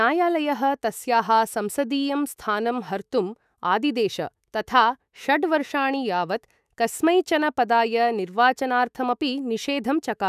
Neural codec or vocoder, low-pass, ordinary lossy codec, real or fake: autoencoder, 48 kHz, 128 numbers a frame, DAC-VAE, trained on Japanese speech; 14.4 kHz; none; fake